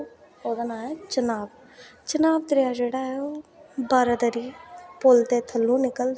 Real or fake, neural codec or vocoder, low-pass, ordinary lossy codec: real; none; none; none